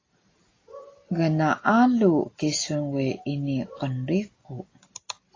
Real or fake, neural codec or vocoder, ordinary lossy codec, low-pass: real; none; AAC, 32 kbps; 7.2 kHz